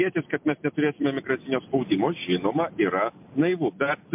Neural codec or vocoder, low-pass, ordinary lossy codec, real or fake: none; 3.6 kHz; MP3, 32 kbps; real